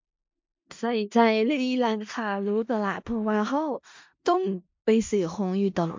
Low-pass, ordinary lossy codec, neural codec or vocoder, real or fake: 7.2 kHz; MP3, 48 kbps; codec, 16 kHz in and 24 kHz out, 0.4 kbps, LongCat-Audio-Codec, four codebook decoder; fake